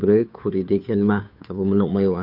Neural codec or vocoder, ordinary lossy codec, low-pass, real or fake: codec, 16 kHz, 2 kbps, FunCodec, trained on Chinese and English, 25 frames a second; none; 5.4 kHz; fake